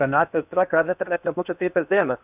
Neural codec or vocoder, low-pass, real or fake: codec, 16 kHz in and 24 kHz out, 0.8 kbps, FocalCodec, streaming, 65536 codes; 3.6 kHz; fake